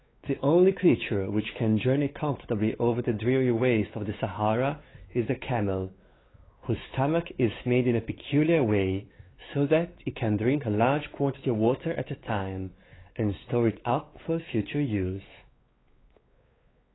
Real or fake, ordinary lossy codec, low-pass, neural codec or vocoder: fake; AAC, 16 kbps; 7.2 kHz; codec, 16 kHz, 4 kbps, X-Codec, WavLM features, trained on Multilingual LibriSpeech